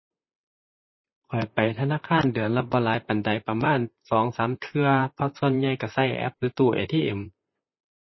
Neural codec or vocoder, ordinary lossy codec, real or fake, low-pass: vocoder, 44.1 kHz, 128 mel bands, Pupu-Vocoder; MP3, 24 kbps; fake; 7.2 kHz